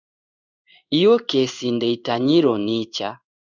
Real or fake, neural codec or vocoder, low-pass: fake; codec, 16 kHz in and 24 kHz out, 1 kbps, XY-Tokenizer; 7.2 kHz